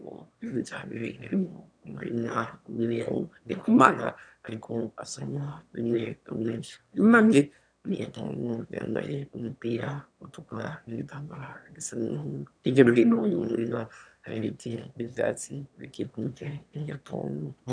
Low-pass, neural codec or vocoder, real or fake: 9.9 kHz; autoencoder, 22.05 kHz, a latent of 192 numbers a frame, VITS, trained on one speaker; fake